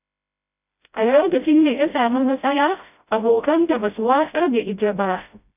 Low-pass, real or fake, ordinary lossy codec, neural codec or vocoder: 3.6 kHz; fake; none; codec, 16 kHz, 0.5 kbps, FreqCodec, smaller model